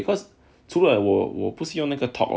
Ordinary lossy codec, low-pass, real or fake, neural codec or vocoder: none; none; real; none